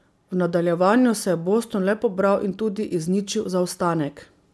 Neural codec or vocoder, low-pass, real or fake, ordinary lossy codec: none; none; real; none